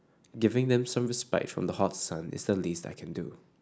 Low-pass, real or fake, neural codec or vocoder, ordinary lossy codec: none; real; none; none